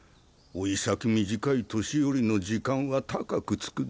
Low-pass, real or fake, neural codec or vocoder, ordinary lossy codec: none; real; none; none